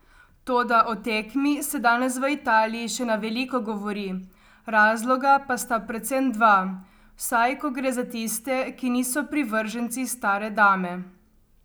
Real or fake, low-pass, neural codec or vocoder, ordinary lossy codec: real; none; none; none